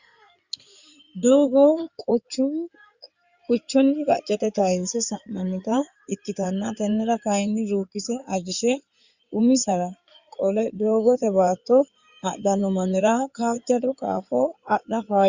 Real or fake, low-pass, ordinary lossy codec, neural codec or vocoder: fake; 7.2 kHz; Opus, 64 kbps; codec, 16 kHz in and 24 kHz out, 2.2 kbps, FireRedTTS-2 codec